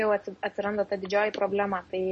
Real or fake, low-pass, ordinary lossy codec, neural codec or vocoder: real; 10.8 kHz; MP3, 32 kbps; none